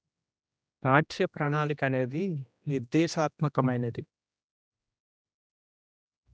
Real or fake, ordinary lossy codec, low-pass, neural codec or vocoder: fake; none; none; codec, 16 kHz, 1 kbps, X-Codec, HuBERT features, trained on general audio